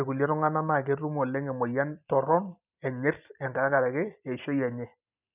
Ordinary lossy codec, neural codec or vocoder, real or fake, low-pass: none; none; real; 3.6 kHz